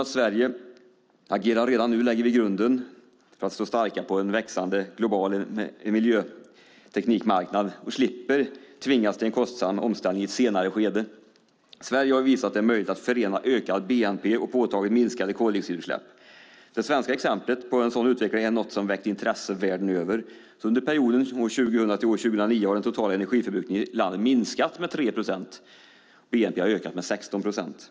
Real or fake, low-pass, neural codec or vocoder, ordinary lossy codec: real; none; none; none